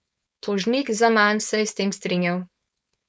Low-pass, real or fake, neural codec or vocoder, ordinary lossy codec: none; fake; codec, 16 kHz, 4.8 kbps, FACodec; none